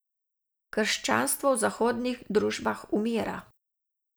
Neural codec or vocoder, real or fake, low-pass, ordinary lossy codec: none; real; none; none